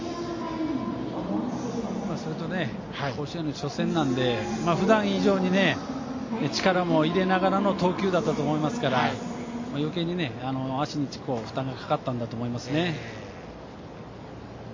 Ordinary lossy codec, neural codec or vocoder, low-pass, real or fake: MP3, 32 kbps; none; 7.2 kHz; real